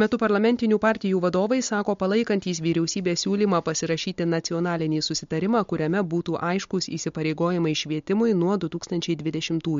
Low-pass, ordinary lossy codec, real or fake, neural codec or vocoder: 7.2 kHz; MP3, 48 kbps; real; none